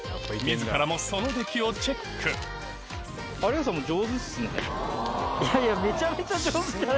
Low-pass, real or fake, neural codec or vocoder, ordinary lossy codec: none; real; none; none